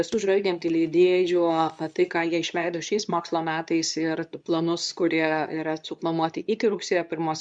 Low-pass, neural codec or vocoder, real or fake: 9.9 kHz; codec, 24 kHz, 0.9 kbps, WavTokenizer, medium speech release version 2; fake